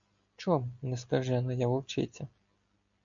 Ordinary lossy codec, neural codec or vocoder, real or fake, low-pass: MP3, 64 kbps; none; real; 7.2 kHz